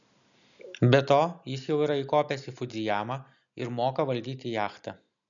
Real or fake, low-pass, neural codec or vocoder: real; 7.2 kHz; none